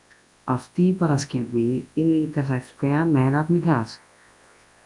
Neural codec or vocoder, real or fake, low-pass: codec, 24 kHz, 0.9 kbps, WavTokenizer, large speech release; fake; 10.8 kHz